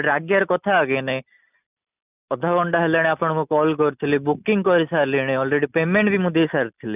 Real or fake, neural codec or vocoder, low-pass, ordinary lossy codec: real; none; 3.6 kHz; none